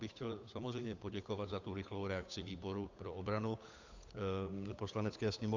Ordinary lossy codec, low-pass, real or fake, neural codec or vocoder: AAC, 48 kbps; 7.2 kHz; fake; codec, 16 kHz, 8 kbps, FunCodec, trained on Chinese and English, 25 frames a second